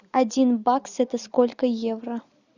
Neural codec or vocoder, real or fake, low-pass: none; real; 7.2 kHz